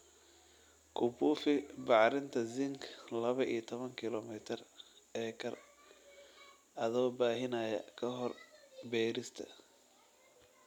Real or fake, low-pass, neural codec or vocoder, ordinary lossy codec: real; 19.8 kHz; none; none